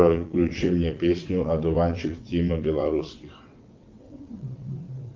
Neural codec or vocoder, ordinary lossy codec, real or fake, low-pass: vocoder, 22.05 kHz, 80 mel bands, WaveNeXt; Opus, 32 kbps; fake; 7.2 kHz